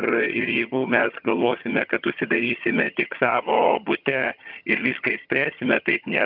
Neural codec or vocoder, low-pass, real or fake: vocoder, 22.05 kHz, 80 mel bands, HiFi-GAN; 5.4 kHz; fake